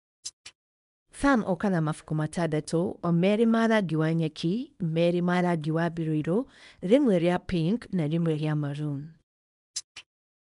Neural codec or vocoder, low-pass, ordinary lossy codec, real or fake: codec, 24 kHz, 0.9 kbps, WavTokenizer, small release; 10.8 kHz; MP3, 96 kbps; fake